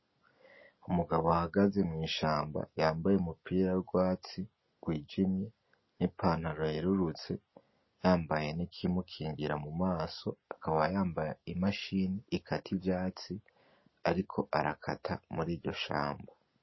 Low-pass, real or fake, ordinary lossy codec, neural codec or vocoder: 7.2 kHz; real; MP3, 24 kbps; none